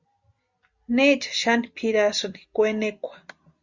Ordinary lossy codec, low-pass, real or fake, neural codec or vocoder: Opus, 64 kbps; 7.2 kHz; real; none